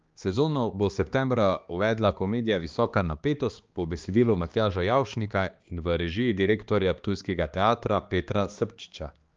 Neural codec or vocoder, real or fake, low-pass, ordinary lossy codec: codec, 16 kHz, 2 kbps, X-Codec, HuBERT features, trained on balanced general audio; fake; 7.2 kHz; Opus, 24 kbps